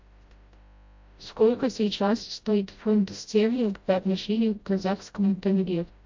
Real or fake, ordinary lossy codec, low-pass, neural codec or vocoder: fake; MP3, 48 kbps; 7.2 kHz; codec, 16 kHz, 0.5 kbps, FreqCodec, smaller model